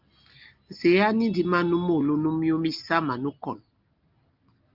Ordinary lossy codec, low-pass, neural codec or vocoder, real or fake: Opus, 32 kbps; 5.4 kHz; none; real